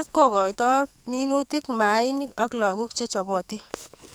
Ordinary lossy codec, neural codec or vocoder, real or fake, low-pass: none; codec, 44.1 kHz, 2.6 kbps, SNAC; fake; none